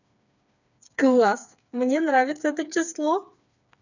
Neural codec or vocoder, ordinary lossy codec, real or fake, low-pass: codec, 16 kHz, 4 kbps, FreqCodec, smaller model; none; fake; 7.2 kHz